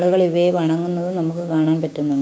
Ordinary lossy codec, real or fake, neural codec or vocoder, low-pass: none; fake; codec, 16 kHz, 6 kbps, DAC; none